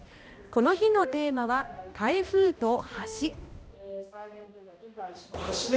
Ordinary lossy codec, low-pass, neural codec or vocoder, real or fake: none; none; codec, 16 kHz, 1 kbps, X-Codec, HuBERT features, trained on balanced general audio; fake